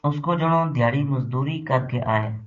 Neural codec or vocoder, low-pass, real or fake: codec, 16 kHz, 6 kbps, DAC; 7.2 kHz; fake